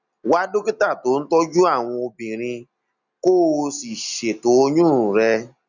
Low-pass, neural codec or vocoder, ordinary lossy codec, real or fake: 7.2 kHz; none; none; real